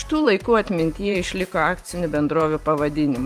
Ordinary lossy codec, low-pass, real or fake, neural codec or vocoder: Opus, 32 kbps; 14.4 kHz; fake; vocoder, 44.1 kHz, 128 mel bands every 512 samples, BigVGAN v2